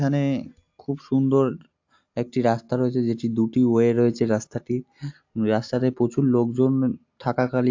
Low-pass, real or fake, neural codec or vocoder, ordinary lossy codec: 7.2 kHz; real; none; AAC, 48 kbps